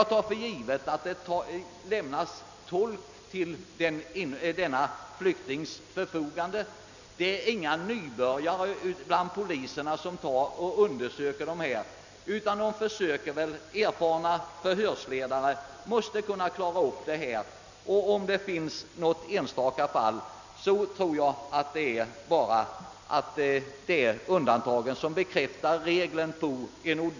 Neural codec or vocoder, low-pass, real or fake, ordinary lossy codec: none; 7.2 kHz; real; none